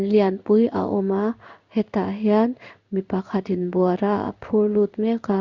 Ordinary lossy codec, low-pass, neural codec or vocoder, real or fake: Opus, 64 kbps; 7.2 kHz; codec, 16 kHz in and 24 kHz out, 1 kbps, XY-Tokenizer; fake